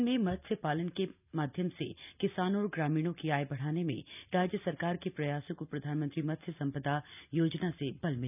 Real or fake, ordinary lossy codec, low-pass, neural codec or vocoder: real; none; 3.6 kHz; none